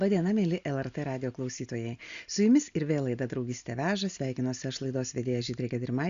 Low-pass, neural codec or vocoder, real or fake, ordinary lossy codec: 7.2 kHz; none; real; Opus, 64 kbps